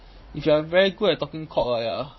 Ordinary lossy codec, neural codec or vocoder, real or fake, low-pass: MP3, 24 kbps; vocoder, 44.1 kHz, 80 mel bands, Vocos; fake; 7.2 kHz